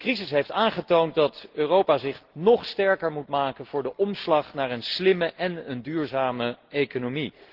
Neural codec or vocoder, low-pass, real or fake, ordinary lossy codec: none; 5.4 kHz; real; Opus, 16 kbps